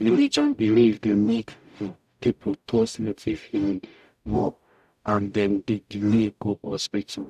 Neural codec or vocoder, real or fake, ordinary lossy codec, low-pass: codec, 44.1 kHz, 0.9 kbps, DAC; fake; none; 14.4 kHz